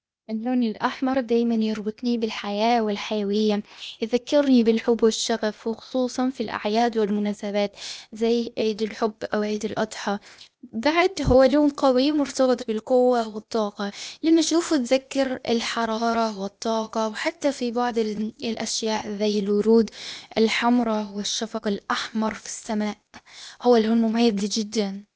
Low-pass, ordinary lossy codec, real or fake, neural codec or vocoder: none; none; fake; codec, 16 kHz, 0.8 kbps, ZipCodec